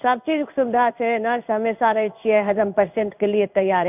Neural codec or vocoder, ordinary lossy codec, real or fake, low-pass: codec, 16 kHz in and 24 kHz out, 1 kbps, XY-Tokenizer; none; fake; 3.6 kHz